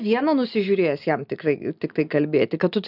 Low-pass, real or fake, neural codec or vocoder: 5.4 kHz; real; none